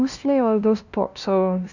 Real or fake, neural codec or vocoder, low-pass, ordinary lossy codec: fake; codec, 16 kHz, 0.5 kbps, FunCodec, trained on LibriTTS, 25 frames a second; 7.2 kHz; none